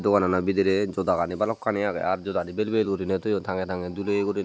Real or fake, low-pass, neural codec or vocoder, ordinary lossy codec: real; none; none; none